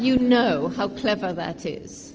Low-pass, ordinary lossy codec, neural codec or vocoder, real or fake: 7.2 kHz; Opus, 24 kbps; none; real